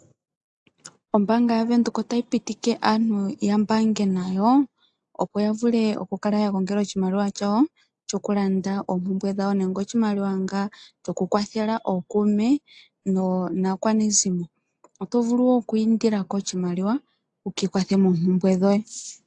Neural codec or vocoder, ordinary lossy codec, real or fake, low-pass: none; AAC, 64 kbps; real; 9.9 kHz